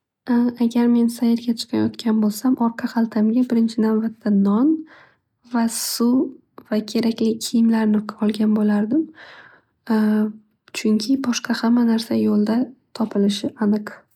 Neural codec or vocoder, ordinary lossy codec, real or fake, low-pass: none; none; real; 19.8 kHz